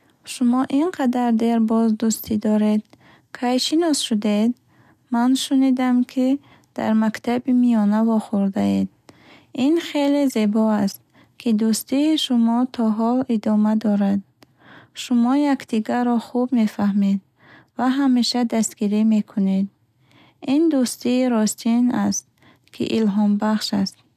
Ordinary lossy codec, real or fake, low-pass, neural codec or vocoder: none; real; 14.4 kHz; none